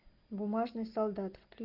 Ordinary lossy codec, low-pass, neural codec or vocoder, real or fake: Opus, 24 kbps; 5.4 kHz; none; real